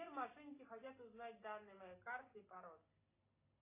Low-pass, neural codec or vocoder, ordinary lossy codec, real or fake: 3.6 kHz; none; AAC, 24 kbps; real